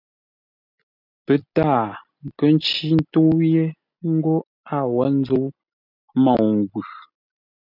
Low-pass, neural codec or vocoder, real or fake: 5.4 kHz; none; real